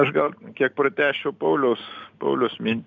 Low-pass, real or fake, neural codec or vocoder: 7.2 kHz; real; none